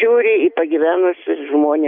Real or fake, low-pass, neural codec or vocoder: real; 5.4 kHz; none